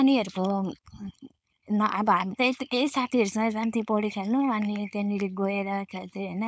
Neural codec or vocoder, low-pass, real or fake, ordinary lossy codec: codec, 16 kHz, 4.8 kbps, FACodec; none; fake; none